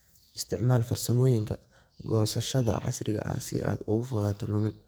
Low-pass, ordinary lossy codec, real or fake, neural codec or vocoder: none; none; fake; codec, 44.1 kHz, 2.6 kbps, SNAC